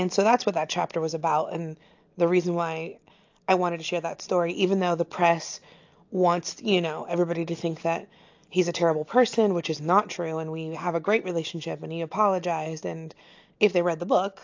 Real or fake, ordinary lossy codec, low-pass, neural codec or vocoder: real; AAC, 48 kbps; 7.2 kHz; none